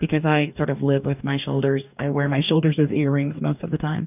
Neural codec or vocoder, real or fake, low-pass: codec, 44.1 kHz, 2.6 kbps, DAC; fake; 3.6 kHz